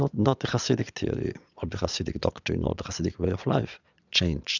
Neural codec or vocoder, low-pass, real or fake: vocoder, 22.05 kHz, 80 mel bands, Vocos; 7.2 kHz; fake